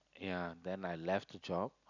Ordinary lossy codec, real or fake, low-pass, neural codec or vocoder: none; real; 7.2 kHz; none